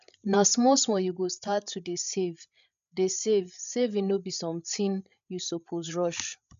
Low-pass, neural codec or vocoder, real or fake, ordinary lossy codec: 7.2 kHz; codec, 16 kHz, 8 kbps, FreqCodec, larger model; fake; none